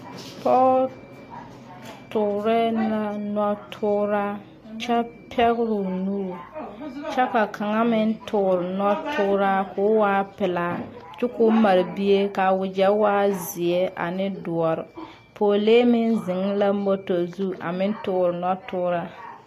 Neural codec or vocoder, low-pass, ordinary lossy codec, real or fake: none; 14.4 kHz; AAC, 48 kbps; real